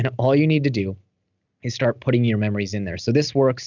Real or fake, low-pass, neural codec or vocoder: real; 7.2 kHz; none